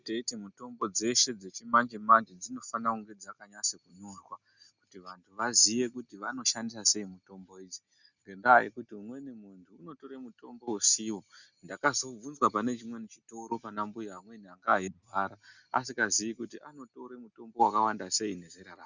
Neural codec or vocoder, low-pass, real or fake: none; 7.2 kHz; real